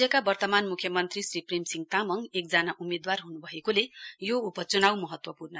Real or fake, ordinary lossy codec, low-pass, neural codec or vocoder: real; none; none; none